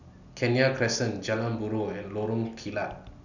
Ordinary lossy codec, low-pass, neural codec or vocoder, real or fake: none; 7.2 kHz; none; real